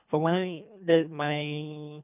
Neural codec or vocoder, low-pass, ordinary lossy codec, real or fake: codec, 24 kHz, 3 kbps, HILCodec; 3.6 kHz; none; fake